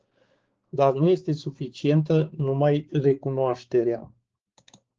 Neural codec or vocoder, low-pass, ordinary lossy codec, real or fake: codec, 16 kHz, 4 kbps, X-Codec, HuBERT features, trained on balanced general audio; 7.2 kHz; Opus, 16 kbps; fake